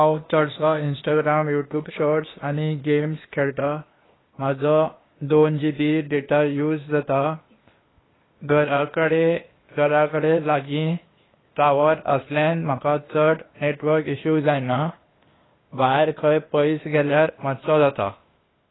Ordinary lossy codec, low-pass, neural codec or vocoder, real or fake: AAC, 16 kbps; 7.2 kHz; codec, 16 kHz, 0.8 kbps, ZipCodec; fake